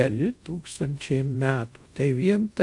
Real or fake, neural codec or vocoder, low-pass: fake; codec, 24 kHz, 0.5 kbps, DualCodec; 10.8 kHz